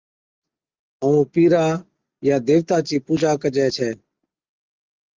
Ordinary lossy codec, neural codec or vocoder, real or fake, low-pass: Opus, 32 kbps; none; real; 7.2 kHz